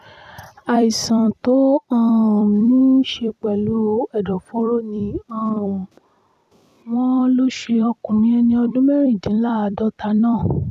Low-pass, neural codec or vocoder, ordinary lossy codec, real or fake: 14.4 kHz; vocoder, 44.1 kHz, 128 mel bands every 256 samples, BigVGAN v2; none; fake